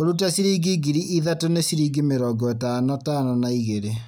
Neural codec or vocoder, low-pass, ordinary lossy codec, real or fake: none; none; none; real